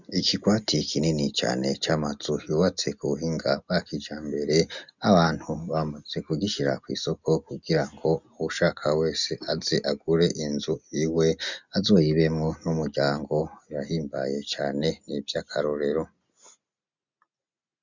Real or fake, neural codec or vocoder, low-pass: real; none; 7.2 kHz